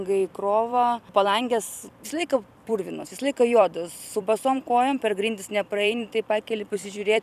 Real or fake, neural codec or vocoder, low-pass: fake; vocoder, 44.1 kHz, 128 mel bands every 256 samples, BigVGAN v2; 14.4 kHz